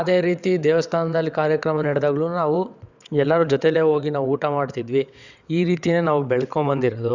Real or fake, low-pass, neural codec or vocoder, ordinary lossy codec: fake; 7.2 kHz; vocoder, 22.05 kHz, 80 mel bands, WaveNeXt; Opus, 64 kbps